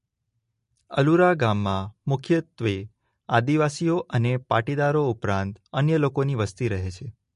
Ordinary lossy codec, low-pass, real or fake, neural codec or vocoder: MP3, 48 kbps; 10.8 kHz; real; none